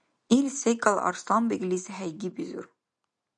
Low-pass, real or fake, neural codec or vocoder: 9.9 kHz; real; none